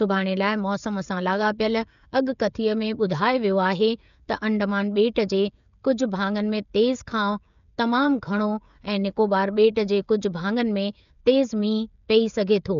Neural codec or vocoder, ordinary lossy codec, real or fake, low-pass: codec, 16 kHz, 16 kbps, FreqCodec, smaller model; none; fake; 7.2 kHz